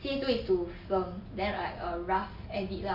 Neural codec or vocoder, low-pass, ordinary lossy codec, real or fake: none; 5.4 kHz; none; real